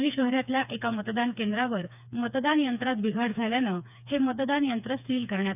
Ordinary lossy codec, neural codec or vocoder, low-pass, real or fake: none; codec, 16 kHz, 4 kbps, FreqCodec, smaller model; 3.6 kHz; fake